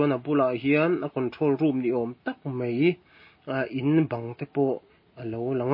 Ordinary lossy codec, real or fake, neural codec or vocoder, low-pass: MP3, 24 kbps; real; none; 5.4 kHz